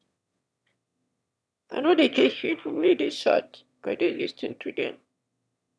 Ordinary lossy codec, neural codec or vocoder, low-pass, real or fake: none; autoencoder, 22.05 kHz, a latent of 192 numbers a frame, VITS, trained on one speaker; none; fake